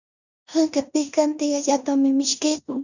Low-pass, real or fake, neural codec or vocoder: 7.2 kHz; fake; codec, 16 kHz in and 24 kHz out, 0.9 kbps, LongCat-Audio-Codec, four codebook decoder